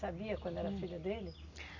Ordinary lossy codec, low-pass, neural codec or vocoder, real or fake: none; 7.2 kHz; none; real